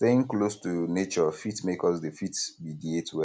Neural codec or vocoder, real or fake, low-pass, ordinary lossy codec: none; real; none; none